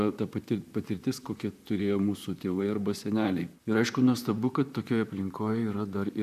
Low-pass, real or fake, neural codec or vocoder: 14.4 kHz; fake; vocoder, 44.1 kHz, 128 mel bands, Pupu-Vocoder